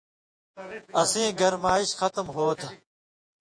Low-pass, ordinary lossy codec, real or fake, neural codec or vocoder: 9.9 kHz; AAC, 64 kbps; fake; vocoder, 48 kHz, 128 mel bands, Vocos